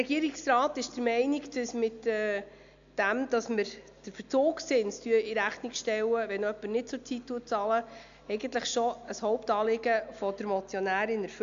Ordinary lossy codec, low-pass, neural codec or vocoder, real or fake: AAC, 64 kbps; 7.2 kHz; none; real